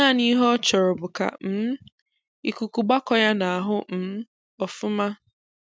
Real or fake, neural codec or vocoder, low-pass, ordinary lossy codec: real; none; none; none